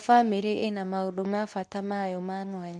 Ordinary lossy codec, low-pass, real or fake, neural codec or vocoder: none; none; fake; codec, 24 kHz, 0.9 kbps, WavTokenizer, medium speech release version 2